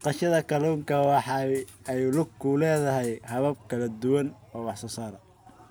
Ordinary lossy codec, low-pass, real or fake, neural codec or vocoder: none; none; real; none